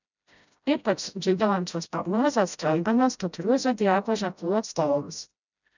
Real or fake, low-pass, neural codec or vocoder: fake; 7.2 kHz; codec, 16 kHz, 0.5 kbps, FreqCodec, smaller model